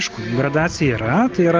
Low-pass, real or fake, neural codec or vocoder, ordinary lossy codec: 7.2 kHz; real; none; Opus, 24 kbps